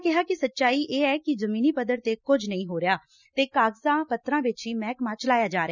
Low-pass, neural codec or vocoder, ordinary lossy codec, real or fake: 7.2 kHz; none; none; real